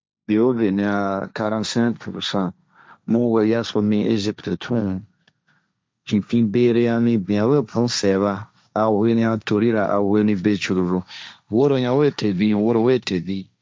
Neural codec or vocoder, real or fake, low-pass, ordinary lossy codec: codec, 16 kHz, 1.1 kbps, Voila-Tokenizer; fake; none; none